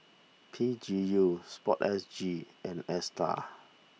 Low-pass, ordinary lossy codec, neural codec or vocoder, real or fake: none; none; none; real